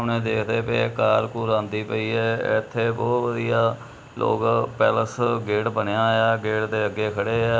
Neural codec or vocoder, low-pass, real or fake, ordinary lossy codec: none; none; real; none